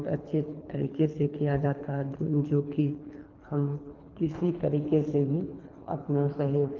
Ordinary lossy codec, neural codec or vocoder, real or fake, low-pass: Opus, 32 kbps; codec, 24 kHz, 3 kbps, HILCodec; fake; 7.2 kHz